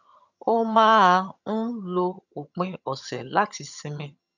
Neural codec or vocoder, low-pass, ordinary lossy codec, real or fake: vocoder, 22.05 kHz, 80 mel bands, HiFi-GAN; 7.2 kHz; none; fake